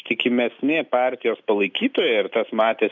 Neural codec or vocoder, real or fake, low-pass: none; real; 7.2 kHz